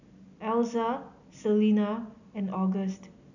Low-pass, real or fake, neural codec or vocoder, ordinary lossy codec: 7.2 kHz; real; none; none